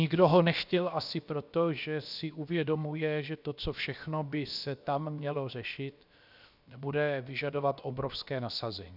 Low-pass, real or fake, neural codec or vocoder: 5.4 kHz; fake; codec, 16 kHz, about 1 kbps, DyCAST, with the encoder's durations